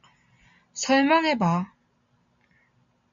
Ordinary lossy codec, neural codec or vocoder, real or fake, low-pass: MP3, 64 kbps; none; real; 7.2 kHz